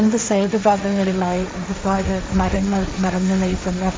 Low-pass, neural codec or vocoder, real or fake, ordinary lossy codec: none; codec, 16 kHz, 1.1 kbps, Voila-Tokenizer; fake; none